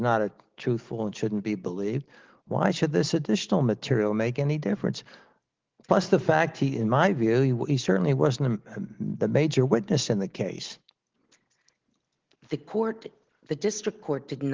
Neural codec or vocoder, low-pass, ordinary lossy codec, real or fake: none; 7.2 kHz; Opus, 16 kbps; real